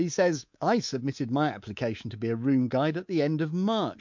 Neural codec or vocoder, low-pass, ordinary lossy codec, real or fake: codec, 24 kHz, 3.1 kbps, DualCodec; 7.2 kHz; MP3, 48 kbps; fake